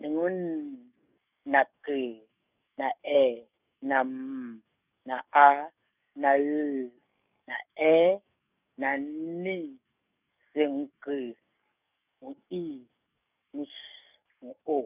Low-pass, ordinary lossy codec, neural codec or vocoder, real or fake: 3.6 kHz; none; codec, 44.1 kHz, 7.8 kbps, DAC; fake